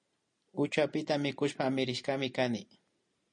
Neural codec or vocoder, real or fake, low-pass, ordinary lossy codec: none; real; 9.9 kHz; MP3, 48 kbps